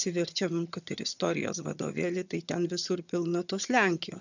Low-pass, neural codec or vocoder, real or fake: 7.2 kHz; vocoder, 22.05 kHz, 80 mel bands, HiFi-GAN; fake